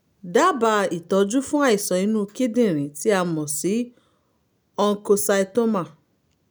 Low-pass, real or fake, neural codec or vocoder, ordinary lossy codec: none; real; none; none